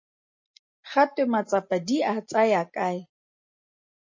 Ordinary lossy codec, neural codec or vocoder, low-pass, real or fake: MP3, 32 kbps; none; 7.2 kHz; real